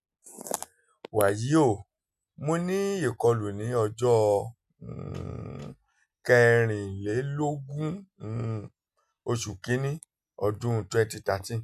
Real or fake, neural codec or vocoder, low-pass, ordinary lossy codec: real; none; 14.4 kHz; none